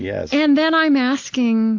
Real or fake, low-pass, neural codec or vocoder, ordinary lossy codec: real; 7.2 kHz; none; AAC, 48 kbps